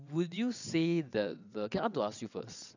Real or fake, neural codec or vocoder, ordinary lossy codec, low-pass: fake; vocoder, 22.05 kHz, 80 mel bands, WaveNeXt; none; 7.2 kHz